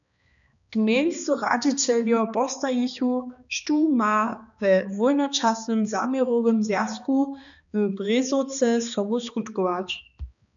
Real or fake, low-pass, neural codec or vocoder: fake; 7.2 kHz; codec, 16 kHz, 2 kbps, X-Codec, HuBERT features, trained on balanced general audio